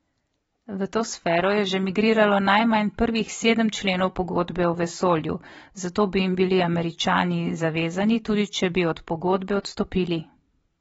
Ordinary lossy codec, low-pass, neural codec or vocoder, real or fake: AAC, 24 kbps; 19.8 kHz; vocoder, 44.1 kHz, 128 mel bands every 512 samples, BigVGAN v2; fake